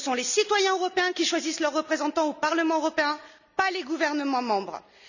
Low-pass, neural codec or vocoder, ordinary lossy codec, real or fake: 7.2 kHz; none; none; real